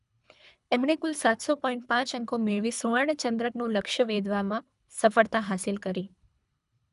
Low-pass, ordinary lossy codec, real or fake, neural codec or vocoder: 10.8 kHz; none; fake; codec, 24 kHz, 3 kbps, HILCodec